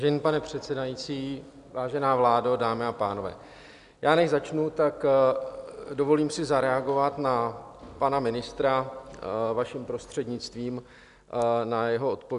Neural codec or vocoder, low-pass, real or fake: none; 10.8 kHz; real